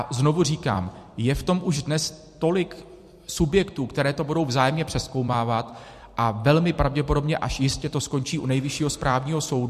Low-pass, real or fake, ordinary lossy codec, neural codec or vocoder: 14.4 kHz; fake; MP3, 64 kbps; vocoder, 44.1 kHz, 128 mel bands every 256 samples, BigVGAN v2